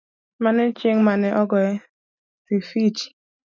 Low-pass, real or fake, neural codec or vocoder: 7.2 kHz; real; none